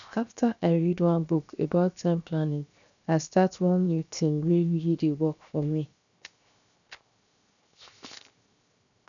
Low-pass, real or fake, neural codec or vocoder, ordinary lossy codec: 7.2 kHz; fake; codec, 16 kHz, 0.7 kbps, FocalCodec; none